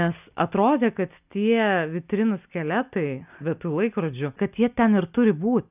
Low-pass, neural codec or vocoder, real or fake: 3.6 kHz; none; real